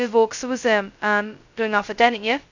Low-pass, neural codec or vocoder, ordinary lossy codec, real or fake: 7.2 kHz; codec, 16 kHz, 0.2 kbps, FocalCodec; none; fake